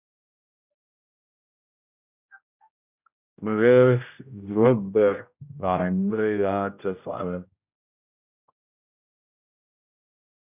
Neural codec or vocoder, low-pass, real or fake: codec, 16 kHz, 0.5 kbps, X-Codec, HuBERT features, trained on general audio; 3.6 kHz; fake